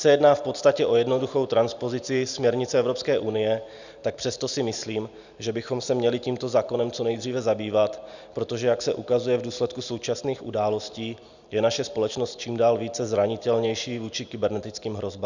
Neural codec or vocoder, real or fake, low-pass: none; real; 7.2 kHz